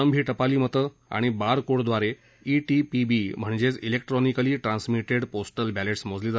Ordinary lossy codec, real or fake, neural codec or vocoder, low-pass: none; real; none; none